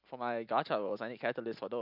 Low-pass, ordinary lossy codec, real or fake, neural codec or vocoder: 5.4 kHz; none; fake; vocoder, 44.1 kHz, 128 mel bands every 256 samples, BigVGAN v2